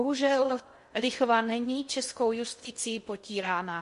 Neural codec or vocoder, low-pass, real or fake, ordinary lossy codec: codec, 16 kHz in and 24 kHz out, 0.6 kbps, FocalCodec, streaming, 2048 codes; 10.8 kHz; fake; MP3, 48 kbps